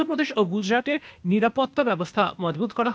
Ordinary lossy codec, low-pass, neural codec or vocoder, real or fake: none; none; codec, 16 kHz, 0.8 kbps, ZipCodec; fake